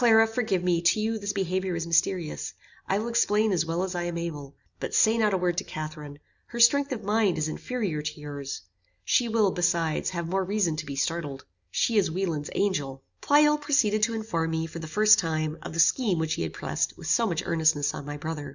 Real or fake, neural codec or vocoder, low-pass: real; none; 7.2 kHz